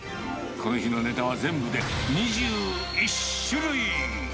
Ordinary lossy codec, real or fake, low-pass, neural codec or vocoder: none; real; none; none